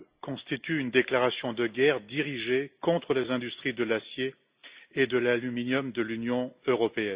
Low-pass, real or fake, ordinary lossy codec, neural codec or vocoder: 3.6 kHz; real; Opus, 64 kbps; none